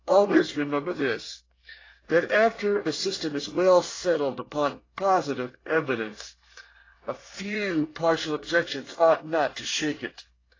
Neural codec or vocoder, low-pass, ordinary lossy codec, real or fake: codec, 24 kHz, 1 kbps, SNAC; 7.2 kHz; AAC, 32 kbps; fake